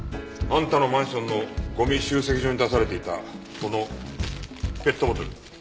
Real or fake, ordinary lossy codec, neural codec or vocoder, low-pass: real; none; none; none